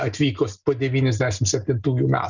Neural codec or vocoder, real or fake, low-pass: none; real; 7.2 kHz